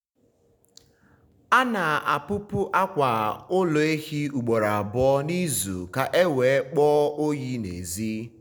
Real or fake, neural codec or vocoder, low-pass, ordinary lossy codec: real; none; none; none